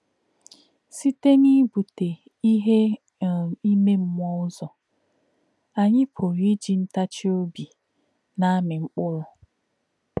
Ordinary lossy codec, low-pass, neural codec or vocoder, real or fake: none; none; none; real